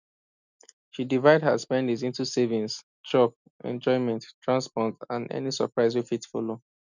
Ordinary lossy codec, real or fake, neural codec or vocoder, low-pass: none; real; none; 7.2 kHz